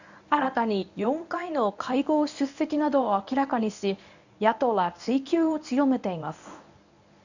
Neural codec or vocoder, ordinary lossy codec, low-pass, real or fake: codec, 24 kHz, 0.9 kbps, WavTokenizer, medium speech release version 1; none; 7.2 kHz; fake